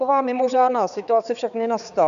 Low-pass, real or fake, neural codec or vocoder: 7.2 kHz; fake; codec, 16 kHz, 4 kbps, X-Codec, HuBERT features, trained on balanced general audio